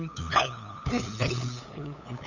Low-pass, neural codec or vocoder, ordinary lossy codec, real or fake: 7.2 kHz; codec, 16 kHz, 8 kbps, FunCodec, trained on LibriTTS, 25 frames a second; none; fake